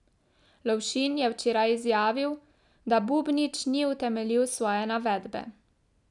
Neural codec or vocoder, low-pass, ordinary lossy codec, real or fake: none; 10.8 kHz; none; real